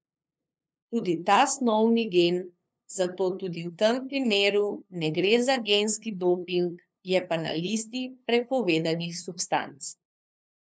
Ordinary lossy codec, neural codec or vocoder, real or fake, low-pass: none; codec, 16 kHz, 2 kbps, FunCodec, trained on LibriTTS, 25 frames a second; fake; none